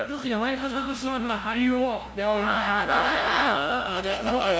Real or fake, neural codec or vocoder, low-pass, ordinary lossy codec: fake; codec, 16 kHz, 0.5 kbps, FunCodec, trained on LibriTTS, 25 frames a second; none; none